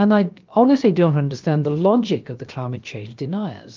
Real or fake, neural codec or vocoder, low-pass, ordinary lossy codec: fake; codec, 16 kHz, about 1 kbps, DyCAST, with the encoder's durations; 7.2 kHz; Opus, 24 kbps